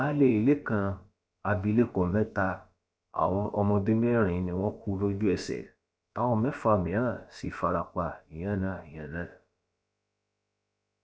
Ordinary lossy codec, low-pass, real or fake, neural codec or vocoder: none; none; fake; codec, 16 kHz, about 1 kbps, DyCAST, with the encoder's durations